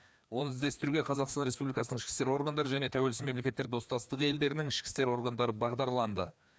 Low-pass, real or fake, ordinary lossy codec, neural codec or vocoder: none; fake; none; codec, 16 kHz, 2 kbps, FreqCodec, larger model